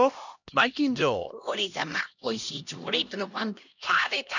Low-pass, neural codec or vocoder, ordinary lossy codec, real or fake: 7.2 kHz; codec, 16 kHz, 1 kbps, X-Codec, HuBERT features, trained on LibriSpeech; none; fake